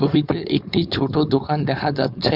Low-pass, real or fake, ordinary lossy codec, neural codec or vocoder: 5.4 kHz; fake; none; codec, 16 kHz, 4.8 kbps, FACodec